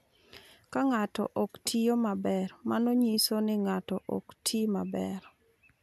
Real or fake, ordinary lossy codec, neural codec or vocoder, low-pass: real; none; none; 14.4 kHz